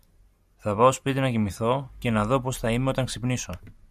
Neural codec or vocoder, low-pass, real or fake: none; 14.4 kHz; real